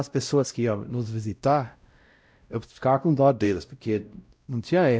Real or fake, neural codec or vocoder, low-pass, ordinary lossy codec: fake; codec, 16 kHz, 0.5 kbps, X-Codec, WavLM features, trained on Multilingual LibriSpeech; none; none